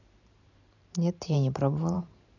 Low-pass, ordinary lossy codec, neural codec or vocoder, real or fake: 7.2 kHz; none; vocoder, 44.1 kHz, 128 mel bands every 512 samples, BigVGAN v2; fake